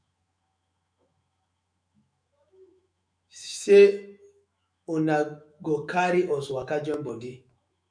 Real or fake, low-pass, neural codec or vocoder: fake; 9.9 kHz; autoencoder, 48 kHz, 128 numbers a frame, DAC-VAE, trained on Japanese speech